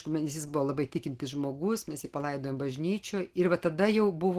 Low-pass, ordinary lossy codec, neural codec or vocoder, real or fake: 14.4 kHz; Opus, 16 kbps; none; real